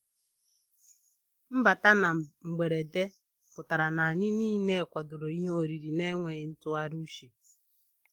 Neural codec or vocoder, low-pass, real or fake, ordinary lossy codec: codec, 44.1 kHz, 7.8 kbps, DAC; 19.8 kHz; fake; Opus, 24 kbps